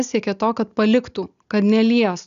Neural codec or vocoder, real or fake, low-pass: none; real; 7.2 kHz